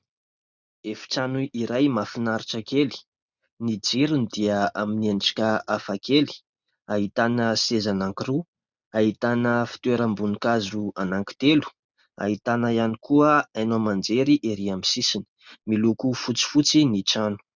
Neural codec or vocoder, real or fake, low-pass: none; real; 7.2 kHz